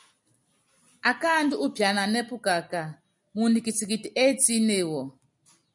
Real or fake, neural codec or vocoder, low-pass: real; none; 10.8 kHz